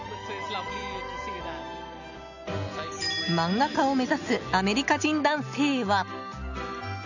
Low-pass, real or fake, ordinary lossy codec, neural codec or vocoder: 7.2 kHz; real; none; none